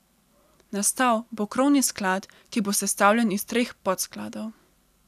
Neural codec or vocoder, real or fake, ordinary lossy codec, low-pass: none; real; none; 14.4 kHz